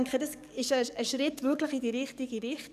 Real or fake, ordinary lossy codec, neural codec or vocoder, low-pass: fake; none; codec, 44.1 kHz, 7.8 kbps, DAC; 14.4 kHz